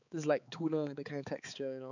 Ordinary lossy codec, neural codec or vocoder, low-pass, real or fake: none; codec, 16 kHz, 4 kbps, X-Codec, HuBERT features, trained on balanced general audio; 7.2 kHz; fake